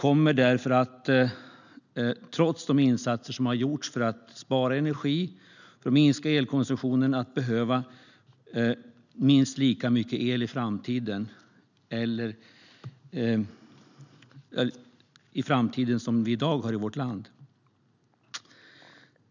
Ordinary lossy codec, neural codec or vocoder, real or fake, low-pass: none; none; real; 7.2 kHz